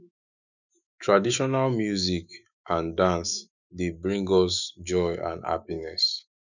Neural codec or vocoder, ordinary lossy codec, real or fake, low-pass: autoencoder, 48 kHz, 128 numbers a frame, DAC-VAE, trained on Japanese speech; none; fake; 7.2 kHz